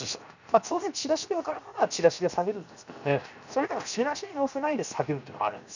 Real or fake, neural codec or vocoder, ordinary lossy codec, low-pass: fake; codec, 16 kHz, 0.7 kbps, FocalCodec; none; 7.2 kHz